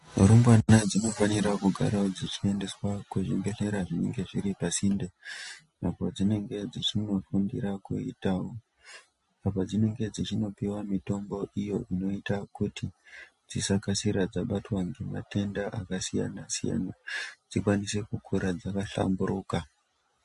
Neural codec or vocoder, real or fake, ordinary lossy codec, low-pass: none; real; MP3, 48 kbps; 14.4 kHz